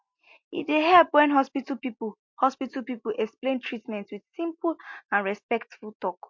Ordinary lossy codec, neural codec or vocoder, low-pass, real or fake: MP3, 48 kbps; none; 7.2 kHz; real